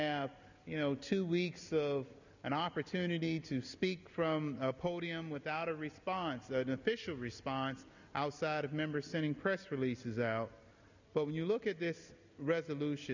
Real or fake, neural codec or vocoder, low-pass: real; none; 7.2 kHz